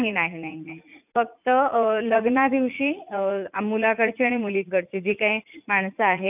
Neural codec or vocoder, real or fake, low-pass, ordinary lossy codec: vocoder, 44.1 kHz, 80 mel bands, Vocos; fake; 3.6 kHz; none